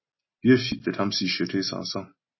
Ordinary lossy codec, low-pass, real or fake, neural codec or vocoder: MP3, 24 kbps; 7.2 kHz; real; none